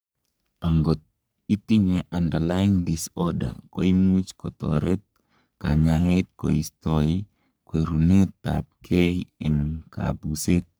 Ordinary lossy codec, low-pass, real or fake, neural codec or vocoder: none; none; fake; codec, 44.1 kHz, 3.4 kbps, Pupu-Codec